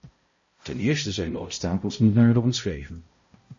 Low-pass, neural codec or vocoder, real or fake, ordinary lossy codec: 7.2 kHz; codec, 16 kHz, 0.5 kbps, X-Codec, HuBERT features, trained on balanced general audio; fake; MP3, 32 kbps